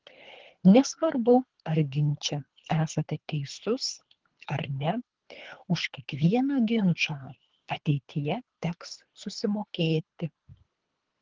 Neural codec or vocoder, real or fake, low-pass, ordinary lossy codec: codec, 24 kHz, 3 kbps, HILCodec; fake; 7.2 kHz; Opus, 16 kbps